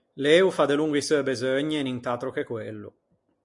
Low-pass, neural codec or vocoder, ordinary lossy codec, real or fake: 10.8 kHz; none; MP3, 64 kbps; real